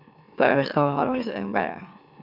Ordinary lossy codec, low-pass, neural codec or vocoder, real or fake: none; 5.4 kHz; autoencoder, 44.1 kHz, a latent of 192 numbers a frame, MeloTTS; fake